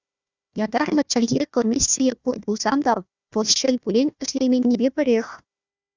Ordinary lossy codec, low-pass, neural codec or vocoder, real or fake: Opus, 64 kbps; 7.2 kHz; codec, 16 kHz, 1 kbps, FunCodec, trained on Chinese and English, 50 frames a second; fake